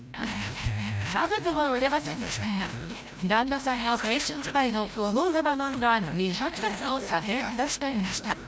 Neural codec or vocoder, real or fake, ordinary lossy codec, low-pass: codec, 16 kHz, 0.5 kbps, FreqCodec, larger model; fake; none; none